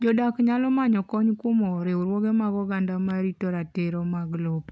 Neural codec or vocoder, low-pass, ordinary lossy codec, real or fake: none; none; none; real